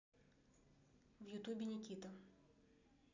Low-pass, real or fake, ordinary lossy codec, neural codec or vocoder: 7.2 kHz; real; none; none